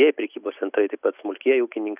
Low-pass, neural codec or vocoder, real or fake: 3.6 kHz; none; real